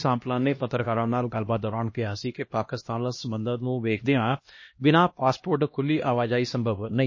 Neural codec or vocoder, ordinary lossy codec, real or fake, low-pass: codec, 16 kHz, 1 kbps, X-Codec, HuBERT features, trained on LibriSpeech; MP3, 32 kbps; fake; 7.2 kHz